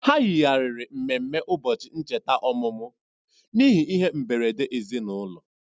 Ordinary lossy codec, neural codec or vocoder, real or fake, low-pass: none; none; real; none